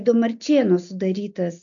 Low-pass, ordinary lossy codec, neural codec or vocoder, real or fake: 7.2 kHz; AAC, 64 kbps; none; real